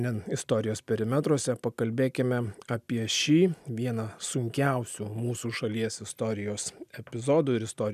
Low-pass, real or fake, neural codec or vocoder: 14.4 kHz; real; none